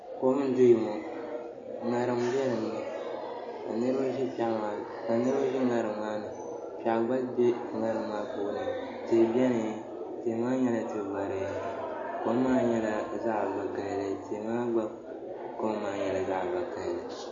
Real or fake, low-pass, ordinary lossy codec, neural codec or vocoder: real; 7.2 kHz; MP3, 32 kbps; none